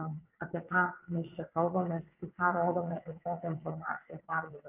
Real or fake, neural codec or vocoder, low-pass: fake; vocoder, 22.05 kHz, 80 mel bands, WaveNeXt; 3.6 kHz